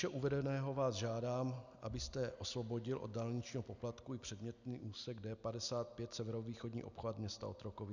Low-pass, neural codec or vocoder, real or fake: 7.2 kHz; none; real